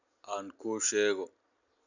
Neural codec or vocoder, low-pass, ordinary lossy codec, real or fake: none; 7.2 kHz; none; real